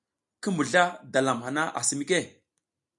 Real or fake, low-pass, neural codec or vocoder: real; 10.8 kHz; none